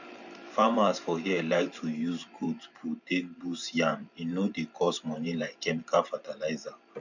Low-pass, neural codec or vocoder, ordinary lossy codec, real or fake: 7.2 kHz; vocoder, 44.1 kHz, 128 mel bands every 512 samples, BigVGAN v2; none; fake